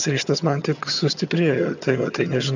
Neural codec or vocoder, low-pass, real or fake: vocoder, 22.05 kHz, 80 mel bands, HiFi-GAN; 7.2 kHz; fake